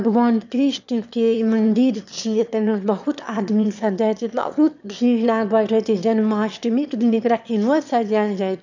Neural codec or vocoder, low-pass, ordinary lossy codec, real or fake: autoencoder, 22.05 kHz, a latent of 192 numbers a frame, VITS, trained on one speaker; 7.2 kHz; none; fake